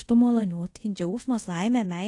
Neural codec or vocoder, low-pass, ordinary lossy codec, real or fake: codec, 24 kHz, 0.5 kbps, DualCodec; 10.8 kHz; AAC, 48 kbps; fake